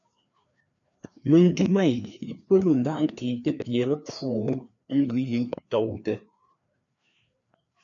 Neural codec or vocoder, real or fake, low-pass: codec, 16 kHz, 2 kbps, FreqCodec, larger model; fake; 7.2 kHz